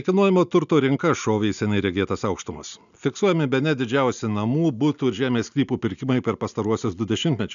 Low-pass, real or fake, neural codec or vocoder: 7.2 kHz; real; none